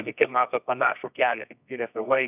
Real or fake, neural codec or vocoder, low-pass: fake; codec, 24 kHz, 0.9 kbps, WavTokenizer, medium music audio release; 3.6 kHz